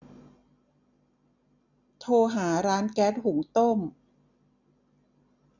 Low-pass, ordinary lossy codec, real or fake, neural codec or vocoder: 7.2 kHz; none; real; none